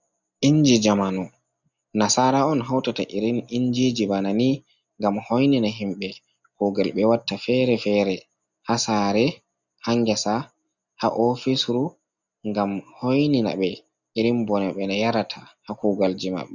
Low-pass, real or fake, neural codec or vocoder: 7.2 kHz; real; none